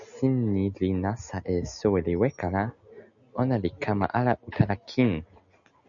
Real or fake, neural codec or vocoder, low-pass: real; none; 7.2 kHz